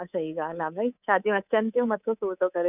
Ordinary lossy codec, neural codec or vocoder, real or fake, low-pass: none; codec, 24 kHz, 3.1 kbps, DualCodec; fake; 3.6 kHz